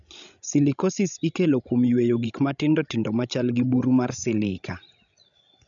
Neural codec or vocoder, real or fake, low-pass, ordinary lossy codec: codec, 16 kHz, 16 kbps, FreqCodec, larger model; fake; 7.2 kHz; none